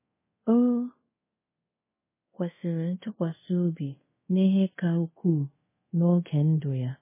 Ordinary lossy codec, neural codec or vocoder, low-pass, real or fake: MP3, 16 kbps; codec, 24 kHz, 0.5 kbps, DualCodec; 3.6 kHz; fake